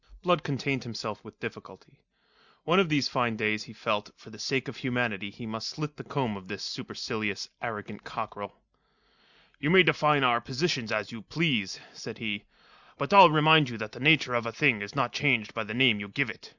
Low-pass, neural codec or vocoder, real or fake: 7.2 kHz; none; real